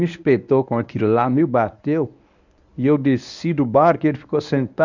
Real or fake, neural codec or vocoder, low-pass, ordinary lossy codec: fake; codec, 24 kHz, 0.9 kbps, WavTokenizer, medium speech release version 1; 7.2 kHz; none